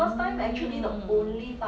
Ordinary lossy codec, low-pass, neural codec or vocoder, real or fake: none; none; none; real